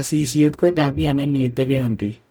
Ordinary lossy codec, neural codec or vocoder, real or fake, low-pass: none; codec, 44.1 kHz, 0.9 kbps, DAC; fake; none